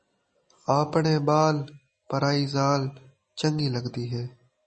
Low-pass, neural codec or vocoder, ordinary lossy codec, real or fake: 10.8 kHz; none; MP3, 32 kbps; real